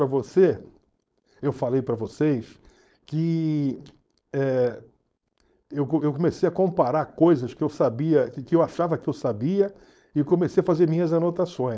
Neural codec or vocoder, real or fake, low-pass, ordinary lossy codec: codec, 16 kHz, 4.8 kbps, FACodec; fake; none; none